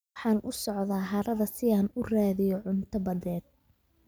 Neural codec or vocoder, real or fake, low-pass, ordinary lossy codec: none; real; none; none